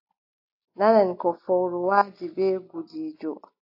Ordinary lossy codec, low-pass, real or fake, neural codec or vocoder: AAC, 32 kbps; 5.4 kHz; real; none